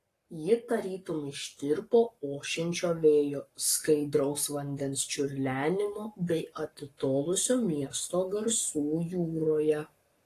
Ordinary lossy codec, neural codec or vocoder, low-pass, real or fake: AAC, 48 kbps; codec, 44.1 kHz, 7.8 kbps, Pupu-Codec; 14.4 kHz; fake